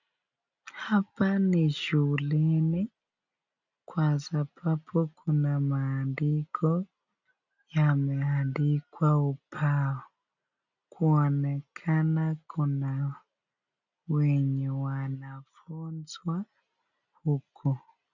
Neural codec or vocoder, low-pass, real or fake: none; 7.2 kHz; real